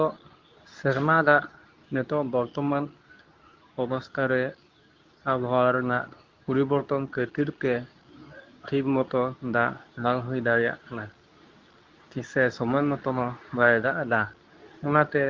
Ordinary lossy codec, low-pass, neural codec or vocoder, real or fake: Opus, 32 kbps; 7.2 kHz; codec, 24 kHz, 0.9 kbps, WavTokenizer, medium speech release version 2; fake